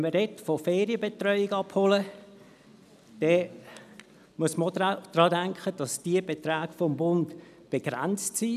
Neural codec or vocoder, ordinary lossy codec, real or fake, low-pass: none; none; real; 14.4 kHz